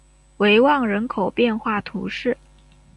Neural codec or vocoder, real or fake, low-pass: none; real; 10.8 kHz